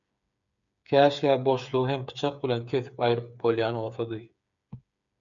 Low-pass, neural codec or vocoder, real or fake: 7.2 kHz; codec, 16 kHz, 8 kbps, FreqCodec, smaller model; fake